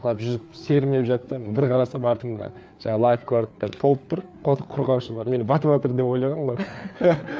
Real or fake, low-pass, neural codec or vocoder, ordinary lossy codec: fake; none; codec, 16 kHz, 4 kbps, FreqCodec, larger model; none